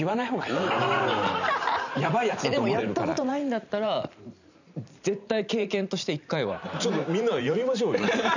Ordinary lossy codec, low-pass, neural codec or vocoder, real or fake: none; 7.2 kHz; vocoder, 44.1 kHz, 128 mel bands every 512 samples, BigVGAN v2; fake